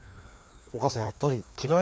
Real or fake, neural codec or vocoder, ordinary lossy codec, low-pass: fake; codec, 16 kHz, 2 kbps, FreqCodec, larger model; none; none